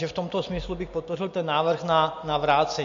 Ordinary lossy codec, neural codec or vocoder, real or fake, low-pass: MP3, 48 kbps; none; real; 7.2 kHz